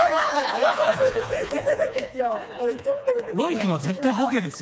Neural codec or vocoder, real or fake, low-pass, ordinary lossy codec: codec, 16 kHz, 2 kbps, FreqCodec, smaller model; fake; none; none